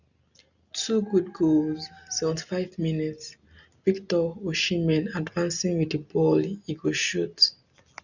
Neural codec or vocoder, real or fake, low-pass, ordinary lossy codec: none; real; 7.2 kHz; none